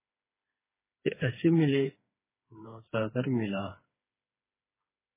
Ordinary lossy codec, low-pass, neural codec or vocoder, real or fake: MP3, 16 kbps; 3.6 kHz; codec, 16 kHz, 4 kbps, FreqCodec, smaller model; fake